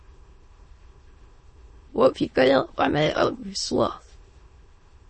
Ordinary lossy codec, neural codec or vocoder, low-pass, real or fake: MP3, 32 kbps; autoencoder, 22.05 kHz, a latent of 192 numbers a frame, VITS, trained on many speakers; 9.9 kHz; fake